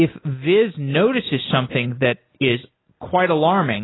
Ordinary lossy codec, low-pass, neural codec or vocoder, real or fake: AAC, 16 kbps; 7.2 kHz; none; real